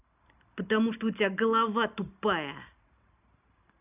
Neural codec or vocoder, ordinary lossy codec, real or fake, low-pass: none; none; real; 3.6 kHz